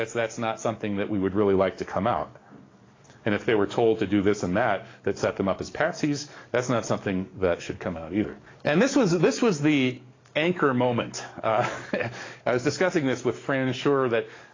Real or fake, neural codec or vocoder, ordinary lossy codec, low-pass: fake; codec, 44.1 kHz, 7.8 kbps, DAC; AAC, 32 kbps; 7.2 kHz